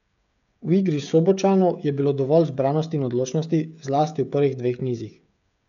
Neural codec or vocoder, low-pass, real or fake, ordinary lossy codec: codec, 16 kHz, 16 kbps, FreqCodec, smaller model; 7.2 kHz; fake; none